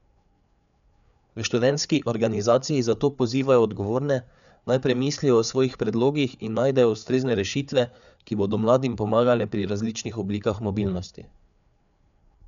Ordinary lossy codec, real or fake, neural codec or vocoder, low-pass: none; fake; codec, 16 kHz, 4 kbps, FreqCodec, larger model; 7.2 kHz